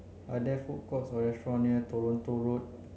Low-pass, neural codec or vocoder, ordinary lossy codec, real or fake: none; none; none; real